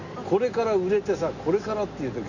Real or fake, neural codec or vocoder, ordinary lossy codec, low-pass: real; none; none; 7.2 kHz